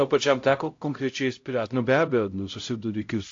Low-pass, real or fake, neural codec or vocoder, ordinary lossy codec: 7.2 kHz; fake; codec, 16 kHz, 0.5 kbps, X-Codec, HuBERT features, trained on LibriSpeech; AAC, 48 kbps